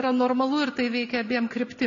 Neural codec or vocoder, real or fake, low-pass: none; real; 7.2 kHz